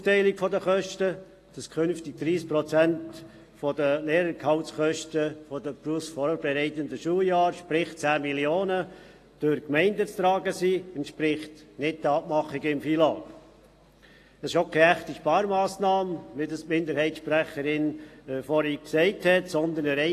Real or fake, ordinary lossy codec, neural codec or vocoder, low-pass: real; AAC, 48 kbps; none; 14.4 kHz